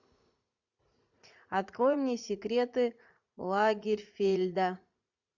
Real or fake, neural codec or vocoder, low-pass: fake; vocoder, 44.1 kHz, 80 mel bands, Vocos; 7.2 kHz